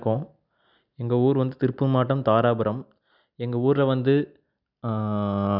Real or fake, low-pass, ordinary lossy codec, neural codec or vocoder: real; 5.4 kHz; none; none